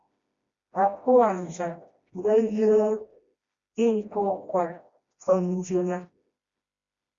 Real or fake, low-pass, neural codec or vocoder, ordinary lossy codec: fake; 7.2 kHz; codec, 16 kHz, 1 kbps, FreqCodec, smaller model; Opus, 64 kbps